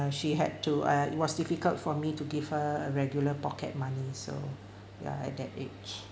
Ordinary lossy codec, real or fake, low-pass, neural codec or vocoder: none; real; none; none